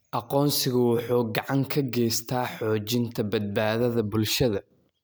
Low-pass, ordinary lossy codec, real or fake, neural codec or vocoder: none; none; real; none